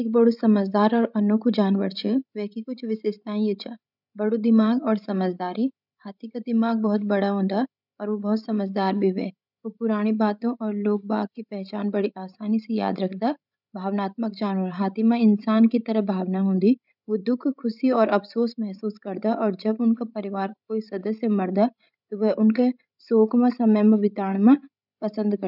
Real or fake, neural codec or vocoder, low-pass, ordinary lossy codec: fake; codec, 16 kHz, 16 kbps, FreqCodec, larger model; 5.4 kHz; none